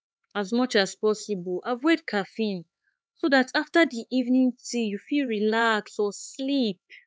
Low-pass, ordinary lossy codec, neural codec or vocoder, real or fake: none; none; codec, 16 kHz, 4 kbps, X-Codec, HuBERT features, trained on LibriSpeech; fake